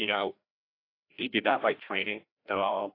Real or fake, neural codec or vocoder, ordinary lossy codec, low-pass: fake; codec, 16 kHz, 1 kbps, FreqCodec, larger model; AAC, 32 kbps; 5.4 kHz